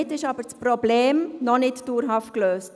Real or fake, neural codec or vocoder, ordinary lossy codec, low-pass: real; none; none; none